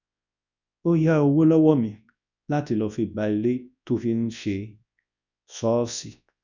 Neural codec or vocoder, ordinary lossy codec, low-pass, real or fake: codec, 24 kHz, 0.9 kbps, WavTokenizer, large speech release; none; 7.2 kHz; fake